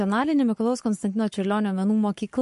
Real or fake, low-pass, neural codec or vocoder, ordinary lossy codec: fake; 14.4 kHz; codec, 44.1 kHz, 7.8 kbps, Pupu-Codec; MP3, 48 kbps